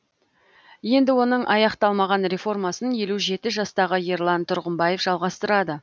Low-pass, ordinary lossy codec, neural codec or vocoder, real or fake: none; none; none; real